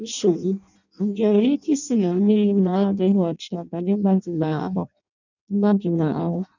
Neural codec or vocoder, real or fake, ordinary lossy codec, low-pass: codec, 16 kHz in and 24 kHz out, 0.6 kbps, FireRedTTS-2 codec; fake; none; 7.2 kHz